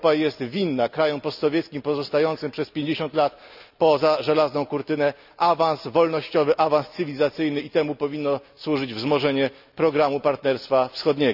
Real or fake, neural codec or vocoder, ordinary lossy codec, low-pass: real; none; none; 5.4 kHz